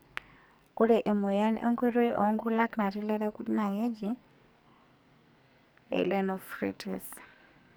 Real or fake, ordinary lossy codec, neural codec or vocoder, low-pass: fake; none; codec, 44.1 kHz, 2.6 kbps, SNAC; none